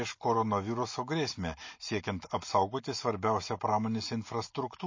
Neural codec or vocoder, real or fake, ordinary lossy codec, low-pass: none; real; MP3, 32 kbps; 7.2 kHz